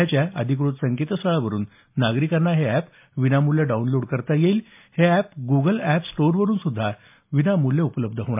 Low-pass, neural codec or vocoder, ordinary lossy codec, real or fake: 3.6 kHz; none; MP3, 32 kbps; real